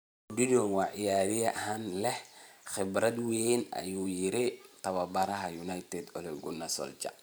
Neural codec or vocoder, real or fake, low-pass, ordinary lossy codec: vocoder, 44.1 kHz, 128 mel bands every 512 samples, BigVGAN v2; fake; none; none